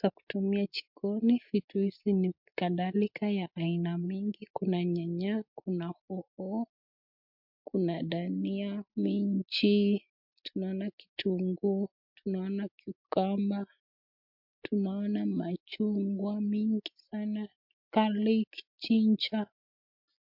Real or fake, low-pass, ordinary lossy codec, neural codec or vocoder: real; 5.4 kHz; MP3, 48 kbps; none